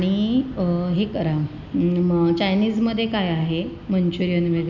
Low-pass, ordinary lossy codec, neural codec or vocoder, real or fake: 7.2 kHz; none; none; real